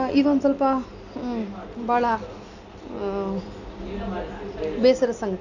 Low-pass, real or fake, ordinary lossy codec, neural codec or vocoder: 7.2 kHz; real; none; none